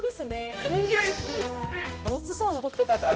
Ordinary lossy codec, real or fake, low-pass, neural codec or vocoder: none; fake; none; codec, 16 kHz, 0.5 kbps, X-Codec, HuBERT features, trained on balanced general audio